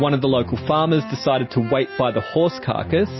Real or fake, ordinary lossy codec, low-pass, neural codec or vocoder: real; MP3, 24 kbps; 7.2 kHz; none